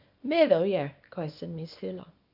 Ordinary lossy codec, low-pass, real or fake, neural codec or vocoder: none; 5.4 kHz; fake; codec, 24 kHz, 0.9 kbps, WavTokenizer, small release